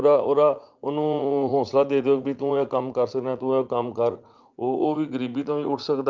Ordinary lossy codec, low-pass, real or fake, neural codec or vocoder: Opus, 32 kbps; 7.2 kHz; fake; vocoder, 44.1 kHz, 80 mel bands, Vocos